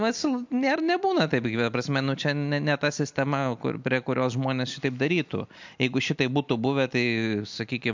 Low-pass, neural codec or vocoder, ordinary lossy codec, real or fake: 7.2 kHz; none; MP3, 64 kbps; real